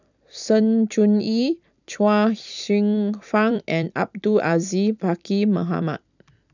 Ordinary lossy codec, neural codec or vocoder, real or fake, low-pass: none; vocoder, 44.1 kHz, 128 mel bands every 256 samples, BigVGAN v2; fake; 7.2 kHz